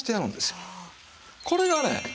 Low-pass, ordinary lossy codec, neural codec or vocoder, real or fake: none; none; none; real